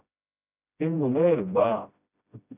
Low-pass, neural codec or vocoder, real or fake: 3.6 kHz; codec, 16 kHz, 0.5 kbps, FreqCodec, smaller model; fake